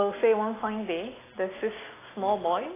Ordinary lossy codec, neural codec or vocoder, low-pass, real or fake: AAC, 16 kbps; none; 3.6 kHz; real